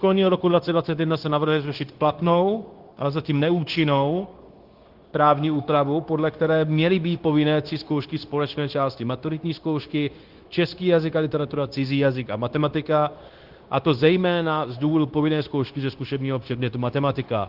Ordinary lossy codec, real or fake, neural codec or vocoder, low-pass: Opus, 16 kbps; fake; codec, 16 kHz, 0.9 kbps, LongCat-Audio-Codec; 5.4 kHz